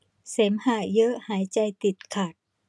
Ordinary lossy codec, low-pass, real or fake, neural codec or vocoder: none; none; real; none